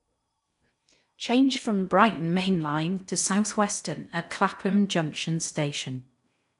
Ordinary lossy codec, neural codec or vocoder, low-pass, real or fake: none; codec, 16 kHz in and 24 kHz out, 0.6 kbps, FocalCodec, streaming, 4096 codes; 10.8 kHz; fake